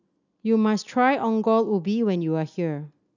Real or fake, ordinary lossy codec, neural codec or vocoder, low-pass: real; none; none; 7.2 kHz